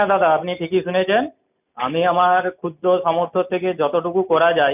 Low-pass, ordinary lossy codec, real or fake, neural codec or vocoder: 3.6 kHz; none; real; none